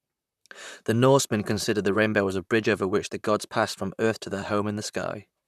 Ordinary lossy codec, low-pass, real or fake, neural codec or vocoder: none; 14.4 kHz; fake; vocoder, 44.1 kHz, 128 mel bands, Pupu-Vocoder